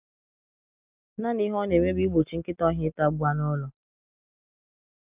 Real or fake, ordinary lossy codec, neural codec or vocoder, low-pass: real; none; none; 3.6 kHz